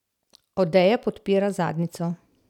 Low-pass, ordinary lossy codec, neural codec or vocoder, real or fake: 19.8 kHz; none; none; real